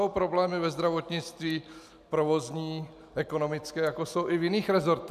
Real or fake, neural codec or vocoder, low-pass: real; none; 14.4 kHz